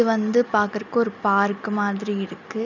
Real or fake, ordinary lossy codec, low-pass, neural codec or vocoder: real; none; 7.2 kHz; none